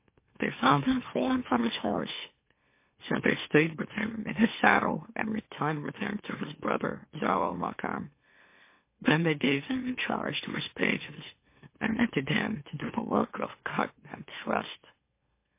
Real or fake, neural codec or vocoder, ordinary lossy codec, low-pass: fake; autoencoder, 44.1 kHz, a latent of 192 numbers a frame, MeloTTS; MP3, 24 kbps; 3.6 kHz